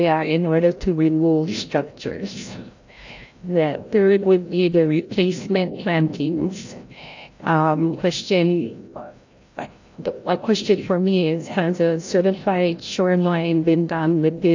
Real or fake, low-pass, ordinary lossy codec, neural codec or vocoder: fake; 7.2 kHz; AAC, 48 kbps; codec, 16 kHz, 0.5 kbps, FreqCodec, larger model